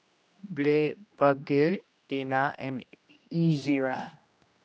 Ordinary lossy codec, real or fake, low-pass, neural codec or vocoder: none; fake; none; codec, 16 kHz, 1 kbps, X-Codec, HuBERT features, trained on general audio